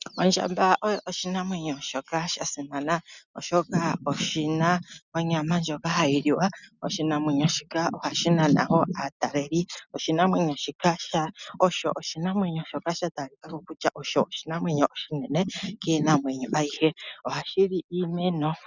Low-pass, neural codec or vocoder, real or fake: 7.2 kHz; none; real